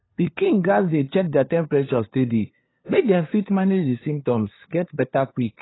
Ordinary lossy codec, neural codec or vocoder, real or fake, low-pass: AAC, 16 kbps; codec, 16 kHz, 2 kbps, FunCodec, trained on LibriTTS, 25 frames a second; fake; 7.2 kHz